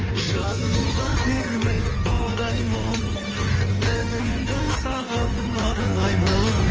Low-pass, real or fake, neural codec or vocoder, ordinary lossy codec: 7.2 kHz; fake; codec, 16 kHz in and 24 kHz out, 1.1 kbps, FireRedTTS-2 codec; Opus, 24 kbps